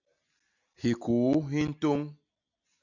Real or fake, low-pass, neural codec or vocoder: real; 7.2 kHz; none